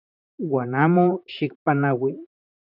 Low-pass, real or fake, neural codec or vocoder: 5.4 kHz; fake; vocoder, 44.1 kHz, 128 mel bands, Pupu-Vocoder